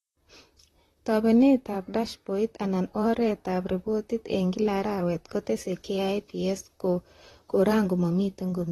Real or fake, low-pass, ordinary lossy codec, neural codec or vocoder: fake; 19.8 kHz; AAC, 32 kbps; vocoder, 44.1 kHz, 128 mel bands, Pupu-Vocoder